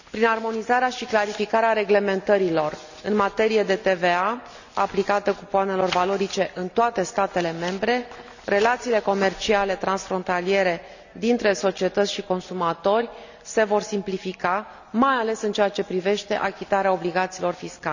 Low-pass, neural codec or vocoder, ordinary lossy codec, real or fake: 7.2 kHz; none; none; real